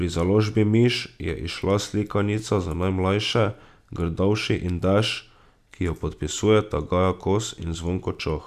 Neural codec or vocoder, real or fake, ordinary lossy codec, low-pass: none; real; none; 14.4 kHz